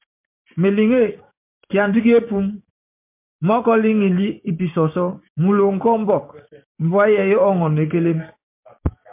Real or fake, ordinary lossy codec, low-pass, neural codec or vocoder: fake; MP3, 32 kbps; 3.6 kHz; codec, 16 kHz, 6 kbps, DAC